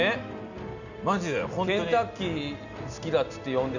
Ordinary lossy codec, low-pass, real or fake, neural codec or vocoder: none; 7.2 kHz; real; none